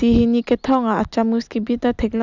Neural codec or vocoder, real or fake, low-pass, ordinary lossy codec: none; real; 7.2 kHz; none